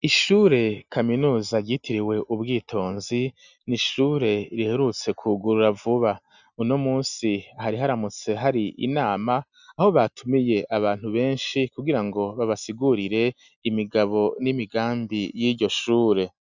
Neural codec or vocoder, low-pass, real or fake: none; 7.2 kHz; real